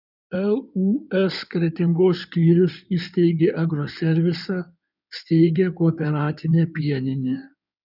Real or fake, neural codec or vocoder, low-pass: fake; codec, 16 kHz in and 24 kHz out, 2.2 kbps, FireRedTTS-2 codec; 5.4 kHz